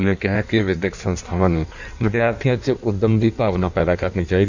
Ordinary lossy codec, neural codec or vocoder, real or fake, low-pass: none; codec, 16 kHz in and 24 kHz out, 1.1 kbps, FireRedTTS-2 codec; fake; 7.2 kHz